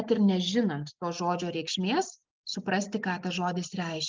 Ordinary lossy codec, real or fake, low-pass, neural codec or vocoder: Opus, 32 kbps; real; 7.2 kHz; none